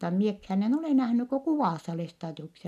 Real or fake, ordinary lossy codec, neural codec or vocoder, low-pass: real; none; none; 14.4 kHz